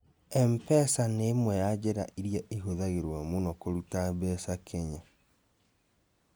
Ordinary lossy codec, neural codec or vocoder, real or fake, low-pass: none; none; real; none